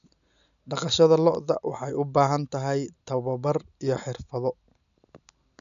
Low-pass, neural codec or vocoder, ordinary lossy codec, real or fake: 7.2 kHz; none; none; real